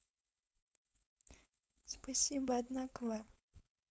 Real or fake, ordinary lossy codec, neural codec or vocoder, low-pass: fake; none; codec, 16 kHz, 4.8 kbps, FACodec; none